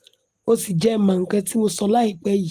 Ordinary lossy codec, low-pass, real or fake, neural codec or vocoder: Opus, 24 kbps; 14.4 kHz; real; none